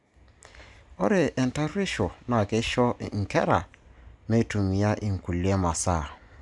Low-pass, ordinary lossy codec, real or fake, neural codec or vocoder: 10.8 kHz; none; real; none